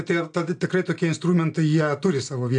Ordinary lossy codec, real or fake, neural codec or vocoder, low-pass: AAC, 64 kbps; real; none; 9.9 kHz